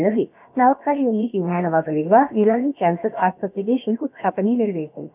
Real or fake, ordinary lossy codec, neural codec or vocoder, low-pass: fake; none; codec, 16 kHz, about 1 kbps, DyCAST, with the encoder's durations; 3.6 kHz